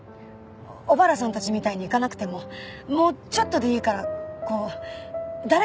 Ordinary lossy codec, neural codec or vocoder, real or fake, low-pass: none; none; real; none